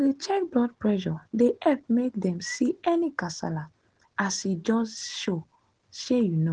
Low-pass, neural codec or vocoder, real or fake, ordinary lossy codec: 9.9 kHz; none; real; Opus, 16 kbps